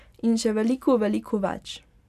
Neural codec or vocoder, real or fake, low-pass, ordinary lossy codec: none; real; 14.4 kHz; none